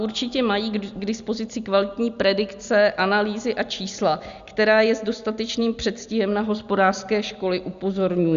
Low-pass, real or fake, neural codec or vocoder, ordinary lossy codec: 7.2 kHz; real; none; Opus, 64 kbps